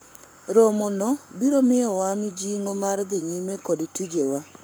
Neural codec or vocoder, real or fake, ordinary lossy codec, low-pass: codec, 44.1 kHz, 7.8 kbps, Pupu-Codec; fake; none; none